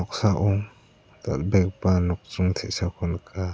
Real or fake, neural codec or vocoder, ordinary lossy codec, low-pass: real; none; none; none